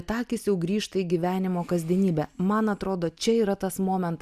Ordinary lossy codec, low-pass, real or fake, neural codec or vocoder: Opus, 64 kbps; 14.4 kHz; real; none